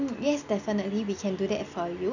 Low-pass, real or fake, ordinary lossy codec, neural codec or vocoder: 7.2 kHz; real; none; none